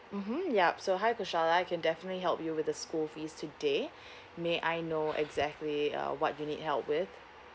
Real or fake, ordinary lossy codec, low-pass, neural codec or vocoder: real; none; none; none